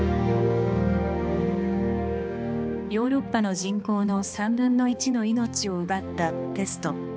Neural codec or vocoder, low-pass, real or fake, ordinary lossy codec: codec, 16 kHz, 2 kbps, X-Codec, HuBERT features, trained on balanced general audio; none; fake; none